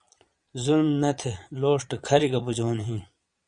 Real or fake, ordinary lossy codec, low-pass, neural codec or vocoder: fake; Opus, 64 kbps; 9.9 kHz; vocoder, 22.05 kHz, 80 mel bands, Vocos